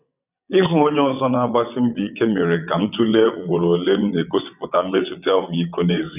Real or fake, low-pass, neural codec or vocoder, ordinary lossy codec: fake; 3.6 kHz; vocoder, 22.05 kHz, 80 mel bands, WaveNeXt; none